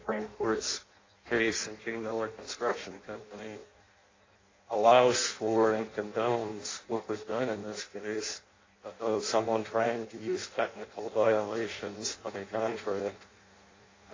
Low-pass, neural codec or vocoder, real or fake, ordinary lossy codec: 7.2 kHz; codec, 16 kHz in and 24 kHz out, 0.6 kbps, FireRedTTS-2 codec; fake; AAC, 32 kbps